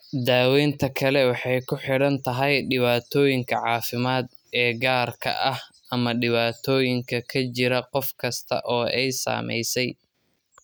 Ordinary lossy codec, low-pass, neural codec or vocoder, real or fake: none; none; none; real